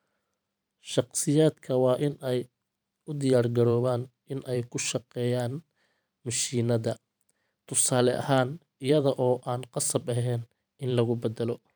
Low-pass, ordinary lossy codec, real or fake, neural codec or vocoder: none; none; fake; vocoder, 44.1 kHz, 128 mel bands every 512 samples, BigVGAN v2